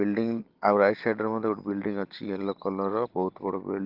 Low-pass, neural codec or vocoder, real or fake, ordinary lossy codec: 5.4 kHz; none; real; Opus, 16 kbps